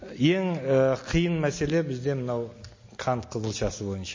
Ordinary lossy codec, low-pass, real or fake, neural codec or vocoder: MP3, 32 kbps; 7.2 kHz; real; none